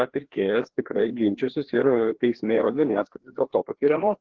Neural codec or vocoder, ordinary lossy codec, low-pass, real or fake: codec, 16 kHz, 2 kbps, FreqCodec, larger model; Opus, 16 kbps; 7.2 kHz; fake